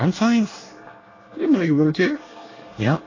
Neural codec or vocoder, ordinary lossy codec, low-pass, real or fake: codec, 24 kHz, 1 kbps, SNAC; AAC, 32 kbps; 7.2 kHz; fake